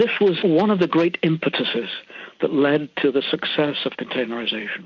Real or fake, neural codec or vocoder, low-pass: real; none; 7.2 kHz